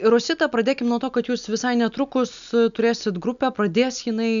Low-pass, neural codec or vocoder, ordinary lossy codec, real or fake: 7.2 kHz; none; MP3, 96 kbps; real